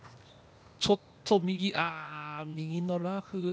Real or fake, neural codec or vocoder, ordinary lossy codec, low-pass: fake; codec, 16 kHz, 0.8 kbps, ZipCodec; none; none